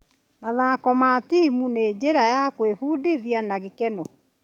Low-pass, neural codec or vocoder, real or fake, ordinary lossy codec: 19.8 kHz; codec, 44.1 kHz, 7.8 kbps, DAC; fake; none